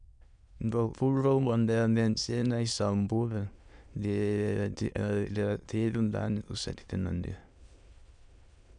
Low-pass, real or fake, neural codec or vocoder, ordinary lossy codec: 9.9 kHz; fake; autoencoder, 22.05 kHz, a latent of 192 numbers a frame, VITS, trained on many speakers; none